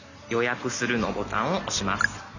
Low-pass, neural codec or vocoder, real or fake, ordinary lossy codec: 7.2 kHz; none; real; none